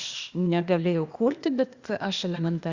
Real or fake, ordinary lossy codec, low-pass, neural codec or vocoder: fake; Opus, 64 kbps; 7.2 kHz; codec, 16 kHz, 0.8 kbps, ZipCodec